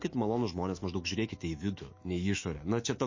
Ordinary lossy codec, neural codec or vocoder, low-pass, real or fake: MP3, 32 kbps; autoencoder, 48 kHz, 128 numbers a frame, DAC-VAE, trained on Japanese speech; 7.2 kHz; fake